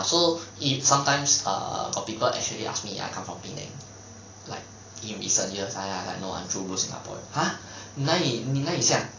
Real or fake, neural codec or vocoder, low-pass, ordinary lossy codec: real; none; 7.2 kHz; AAC, 32 kbps